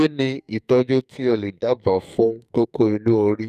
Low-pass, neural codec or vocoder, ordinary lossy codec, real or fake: 14.4 kHz; codec, 44.1 kHz, 2.6 kbps, SNAC; none; fake